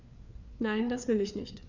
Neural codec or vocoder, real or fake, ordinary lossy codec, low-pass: codec, 16 kHz, 4 kbps, FreqCodec, larger model; fake; none; 7.2 kHz